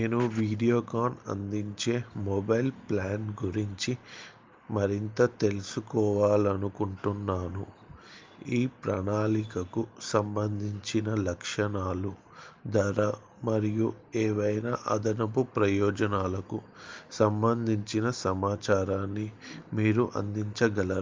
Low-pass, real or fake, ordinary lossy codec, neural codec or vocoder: 7.2 kHz; real; Opus, 24 kbps; none